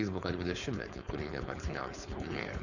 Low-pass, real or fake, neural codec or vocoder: 7.2 kHz; fake; codec, 16 kHz, 4.8 kbps, FACodec